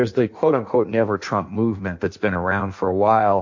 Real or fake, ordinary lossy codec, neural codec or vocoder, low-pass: fake; MP3, 48 kbps; codec, 16 kHz in and 24 kHz out, 1.1 kbps, FireRedTTS-2 codec; 7.2 kHz